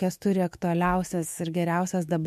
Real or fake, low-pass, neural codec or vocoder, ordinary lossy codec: fake; 14.4 kHz; autoencoder, 48 kHz, 128 numbers a frame, DAC-VAE, trained on Japanese speech; MP3, 64 kbps